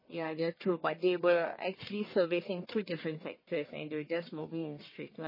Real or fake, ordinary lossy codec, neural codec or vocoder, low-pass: fake; MP3, 24 kbps; codec, 44.1 kHz, 1.7 kbps, Pupu-Codec; 5.4 kHz